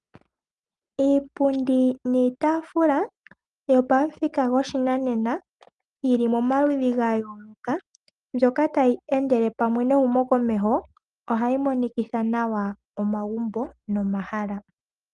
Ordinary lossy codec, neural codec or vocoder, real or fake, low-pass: Opus, 32 kbps; none; real; 10.8 kHz